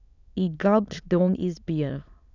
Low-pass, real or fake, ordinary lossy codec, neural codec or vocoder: 7.2 kHz; fake; none; autoencoder, 22.05 kHz, a latent of 192 numbers a frame, VITS, trained on many speakers